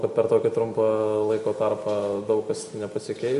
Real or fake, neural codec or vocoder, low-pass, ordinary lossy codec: real; none; 14.4 kHz; MP3, 48 kbps